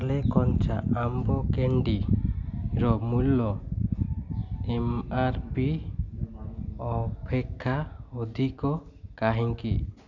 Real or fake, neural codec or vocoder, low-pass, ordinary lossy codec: real; none; 7.2 kHz; none